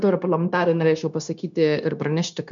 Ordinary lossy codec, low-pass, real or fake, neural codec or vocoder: MP3, 64 kbps; 7.2 kHz; fake; codec, 16 kHz, 0.9 kbps, LongCat-Audio-Codec